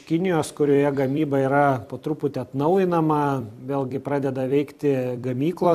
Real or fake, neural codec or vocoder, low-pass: fake; vocoder, 44.1 kHz, 128 mel bands every 256 samples, BigVGAN v2; 14.4 kHz